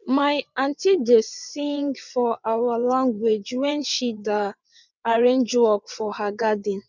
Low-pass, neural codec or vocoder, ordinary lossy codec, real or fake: 7.2 kHz; vocoder, 22.05 kHz, 80 mel bands, WaveNeXt; none; fake